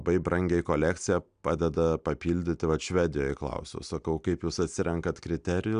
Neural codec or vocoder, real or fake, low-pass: none; real; 9.9 kHz